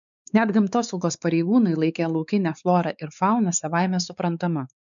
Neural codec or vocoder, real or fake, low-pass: codec, 16 kHz, 4 kbps, X-Codec, WavLM features, trained on Multilingual LibriSpeech; fake; 7.2 kHz